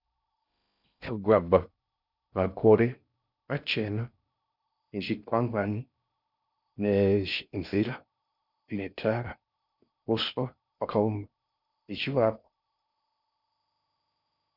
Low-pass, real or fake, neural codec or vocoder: 5.4 kHz; fake; codec, 16 kHz in and 24 kHz out, 0.6 kbps, FocalCodec, streaming, 4096 codes